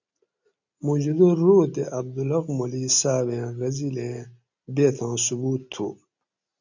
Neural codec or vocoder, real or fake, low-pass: none; real; 7.2 kHz